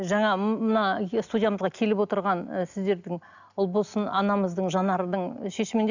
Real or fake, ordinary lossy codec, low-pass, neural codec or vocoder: real; none; 7.2 kHz; none